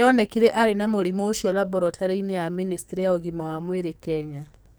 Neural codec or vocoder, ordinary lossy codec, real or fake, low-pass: codec, 44.1 kHz, 2.6 kbps, SNAC; none; fake; none